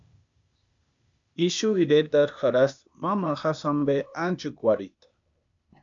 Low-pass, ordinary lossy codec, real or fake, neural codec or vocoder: 7.2 kHz; MP3, 64 kbps; fake; codec, 16 kHz, 0.8 kbps, ZipCodec